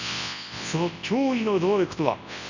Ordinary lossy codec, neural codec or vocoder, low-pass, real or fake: none; codec, 24 kHz, 0.9 kbps, WavTokenizer, large speech release; 7.2 kHz; fake